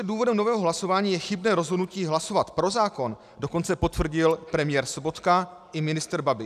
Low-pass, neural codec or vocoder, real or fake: 14.4 kHz; none; real